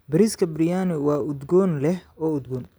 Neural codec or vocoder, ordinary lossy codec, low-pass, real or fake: none; none; none; real